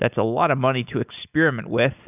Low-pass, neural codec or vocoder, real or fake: 3.6 kHz; none; real